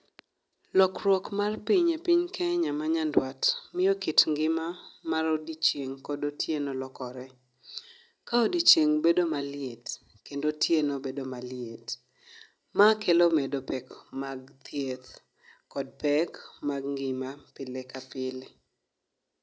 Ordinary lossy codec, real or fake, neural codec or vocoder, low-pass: none; real; none; none